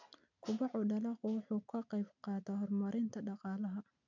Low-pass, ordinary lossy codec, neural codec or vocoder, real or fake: 7.2 kHz; none; none; real